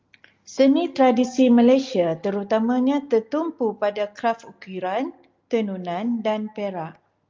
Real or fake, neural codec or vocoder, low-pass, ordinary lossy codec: real; none; 7.2 kHz; Opus, 24 kbps